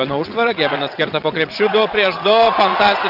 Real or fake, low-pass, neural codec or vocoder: fake; 5.4 kHz; vocoder, 22.05 kHz, 80 mel bands, WaveNeXt